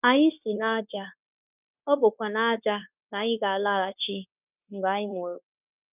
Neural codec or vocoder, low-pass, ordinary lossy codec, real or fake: codec, 16 kHz, 0.9 kbps, LongCat-Audio-Codec; 3.6 kHz; none; fake